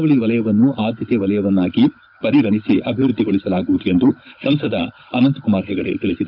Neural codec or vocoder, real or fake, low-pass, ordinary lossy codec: codec, 16 kHz, 16 kbps, FunCodec, trained on Chinese and English, 50 frames a second; fake; 5.4 kHz; none